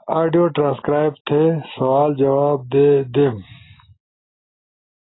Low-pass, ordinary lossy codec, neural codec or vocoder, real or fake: 7.2 kHz; AAC, 16 kbps; none; real